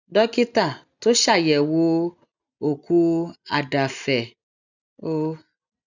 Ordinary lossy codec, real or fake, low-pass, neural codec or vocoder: none; real; 7.2 kHz; none